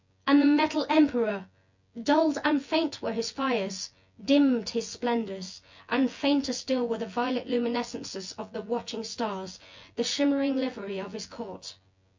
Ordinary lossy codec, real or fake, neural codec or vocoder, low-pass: MP3, 64 kbps; fake; vocoder, 24 kHz, 100 mel bands, Vocos; 7.2 kHz